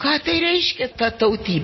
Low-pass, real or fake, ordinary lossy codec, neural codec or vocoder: 7.2 kHz; real; MP3, 24 kbps; none